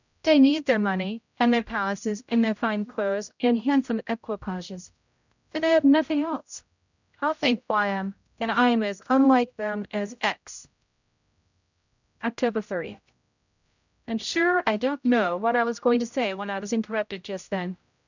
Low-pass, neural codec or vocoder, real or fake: 7.2 kHz; codec, 16 kHz, 0.5 kbps, X-Codec, HuBERT features, trained on general audio; fake